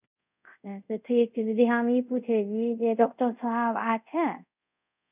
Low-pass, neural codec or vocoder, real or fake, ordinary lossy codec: 3.6 kHz; codec, 24 kHz, 0.5 kbps, DualCodec; fake; none